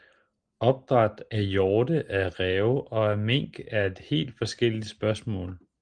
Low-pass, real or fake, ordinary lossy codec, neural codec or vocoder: 9.9 kHz; real; Opus, 24 kbps; none